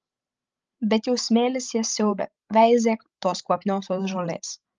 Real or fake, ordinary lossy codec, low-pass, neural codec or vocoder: fake; Opus, 24 kbps; 7.2 kHz; codec, 16 kHz, 16 kbps, FreqCodec, larger model